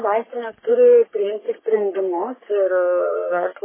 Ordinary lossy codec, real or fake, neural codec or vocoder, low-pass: MP3, 16 kbps; fake; codec, 44.1 kHz, 3.4 kbps, Pupu-Codec; 3.6 kHz